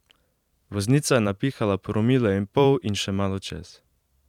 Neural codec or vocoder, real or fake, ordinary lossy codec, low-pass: vocoder, 44.1 kHz, 128 mel bands every 512 samples, BigVGAN v2; fake; none; 19.8 kHz